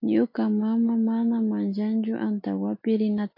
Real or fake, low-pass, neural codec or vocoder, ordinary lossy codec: real; 5.4 kHz; none; AAC, 48 kbps